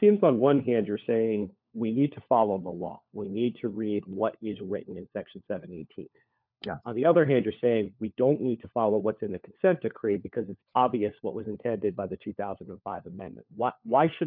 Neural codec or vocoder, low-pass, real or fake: codec, 16 kHz, 4 kbps, FunCodec, trained on LibriTTS, 50 frames a second; 5.4 kHz; fake